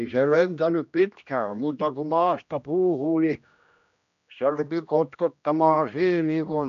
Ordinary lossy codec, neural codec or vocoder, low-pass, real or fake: none; codec, 16 kHz, 1 kbps, X-Codec, HuBERT features, trained on general audio; 7.2 kHz; fake